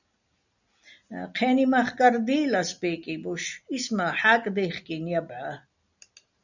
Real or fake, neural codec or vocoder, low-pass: real; none; 7.2 kHz